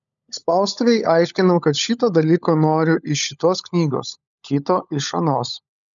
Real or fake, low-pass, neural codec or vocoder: fake; 7.2 kHz; codec, 16 kHz, 16 kbps, FunCodec, trained on LibriTTS, 50 frames a second